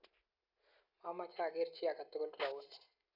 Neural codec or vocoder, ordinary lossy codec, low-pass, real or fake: none; none; 5.4 kHz; real